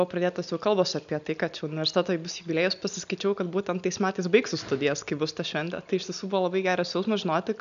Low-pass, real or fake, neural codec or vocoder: 7.2 kHz; real; none